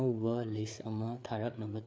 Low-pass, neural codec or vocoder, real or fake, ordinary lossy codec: none; codec, 16 kHz, 4 kbps, FreqCodec, larger model; fake; none